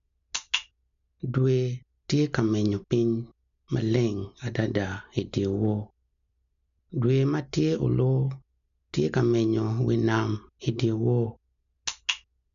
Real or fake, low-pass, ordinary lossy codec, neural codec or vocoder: real; 7.2 kHz; none; none